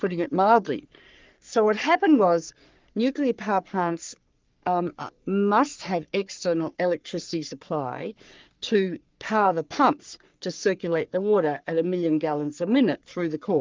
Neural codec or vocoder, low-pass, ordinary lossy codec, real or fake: codec, 44.1 kHz, 3.4 kbps, Pupu-Codec; 7.2 kHz; Opus, 24 kbps; fake